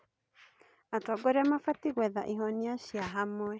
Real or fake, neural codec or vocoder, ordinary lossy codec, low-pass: real; none; none; none